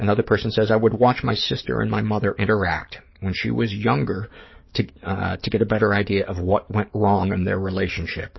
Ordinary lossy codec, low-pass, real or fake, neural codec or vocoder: MP3, 24 kbps; 7.2 kHz; fake; codec, 44.1 kHz, 7.8 kbps, DAC